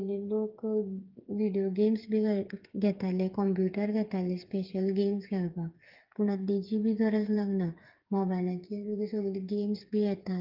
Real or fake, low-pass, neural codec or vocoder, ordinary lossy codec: fake; 5.4 kHz; codec, 16 kHz, 8 kbps, FreqCodec, smaller model; Opus, 32 kbps